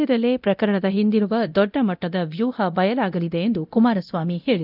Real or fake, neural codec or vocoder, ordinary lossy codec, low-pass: fake; codec, 24 kHz, 0.9 kbps, DualCodec; none; 5.4 kHz